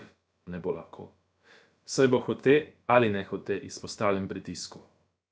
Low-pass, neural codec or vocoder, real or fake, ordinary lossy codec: none; codec, 16 kHz, about 1 kbps, DyCAST, with the encoder's durations; fake; none